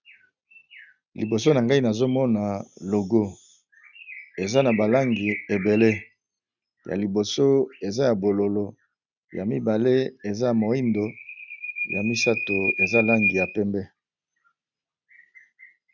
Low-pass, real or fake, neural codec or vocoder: 7.2 kHz; real; none